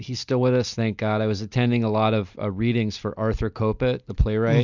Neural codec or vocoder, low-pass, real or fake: none; 7.2 kHz; real